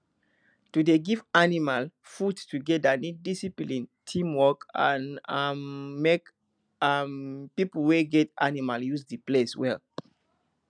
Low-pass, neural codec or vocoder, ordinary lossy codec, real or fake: 9.9 kHz; none; none; real